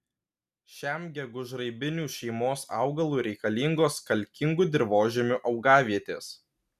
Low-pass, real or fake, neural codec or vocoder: 14.4 kHz; real; none